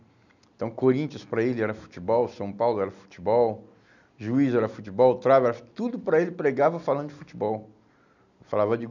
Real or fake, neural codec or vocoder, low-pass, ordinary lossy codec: real; none; 7.2 kHz; none